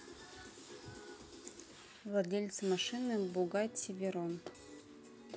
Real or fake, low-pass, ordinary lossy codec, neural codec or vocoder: real; none; none; none